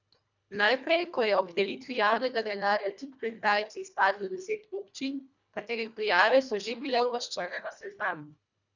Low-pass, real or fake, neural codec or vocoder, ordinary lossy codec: 7.2 kHz; fake; codec, 24 kHz, 1.5 kbps, HILCodec; none